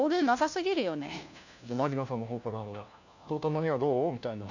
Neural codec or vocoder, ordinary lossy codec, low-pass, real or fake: codec, 16 kHz, 1 kbps, FunCodec, trained on LibriTTS, 50 frames a second; none; 7.2 kHz; fake